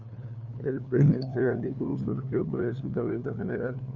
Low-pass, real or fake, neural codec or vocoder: 7.2 kHz; fake; codec, 16 kHz, 2 kbps, FunCodec, trained on LibriTTS, 25 frames a second